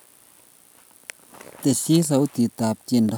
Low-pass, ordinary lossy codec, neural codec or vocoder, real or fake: none; none; none; real